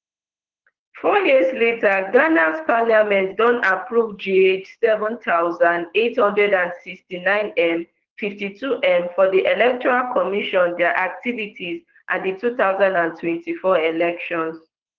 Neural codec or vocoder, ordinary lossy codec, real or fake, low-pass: codec, 24 kHz, 6 kbps, HILCodec; Opus, 16 kbps; fake; 7.2 kHz